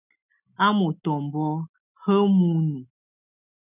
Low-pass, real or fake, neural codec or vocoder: 3.6 kHz; real; none